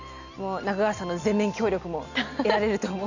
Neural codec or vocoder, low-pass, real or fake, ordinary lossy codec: none; 7.2 kHz; real; none